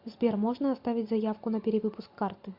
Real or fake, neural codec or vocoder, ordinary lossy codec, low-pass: real; none; MP3, 48 kbps; 5.4 kHz